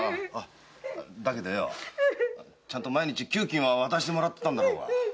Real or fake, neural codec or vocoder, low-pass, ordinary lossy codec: real; none; none; none